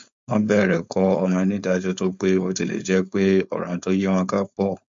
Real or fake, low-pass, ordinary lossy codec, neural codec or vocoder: fake; 7.2 kHz; MP3, 48 kbps; codec, 16 kHz, 4.8 kbps, FACodec